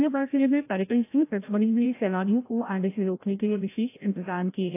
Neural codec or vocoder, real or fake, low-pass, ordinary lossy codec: codec, 16 kHz, 0.5 kbps, FreqCodec, larger model; fake; 3.6 kHz; AAC, 24 kbps